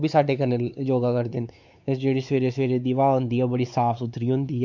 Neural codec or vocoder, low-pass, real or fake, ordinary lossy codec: codec, 16 kHz, 4 kbps, X-Codec, WavLM features, trained on Multilingual LibriSpeech; 7.2 kHz; fake; none